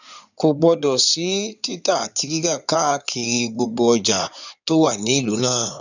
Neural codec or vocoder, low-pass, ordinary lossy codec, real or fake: codec, 16 kHz in and 24 kHz out, 2.2 kbps, FireRedTTS-2 codec; 7.2 kHz; none; fake